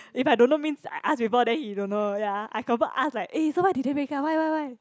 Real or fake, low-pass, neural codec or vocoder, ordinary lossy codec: real; none; none; none